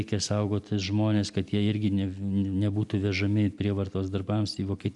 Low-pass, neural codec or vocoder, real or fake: 10.8 kHz; none; real